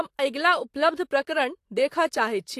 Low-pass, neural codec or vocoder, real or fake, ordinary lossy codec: 14.4 kHz; vocoder, 44.1 kHz, 128 mel bands, Pupu-Vocoder; fake; AAC, 64 kbps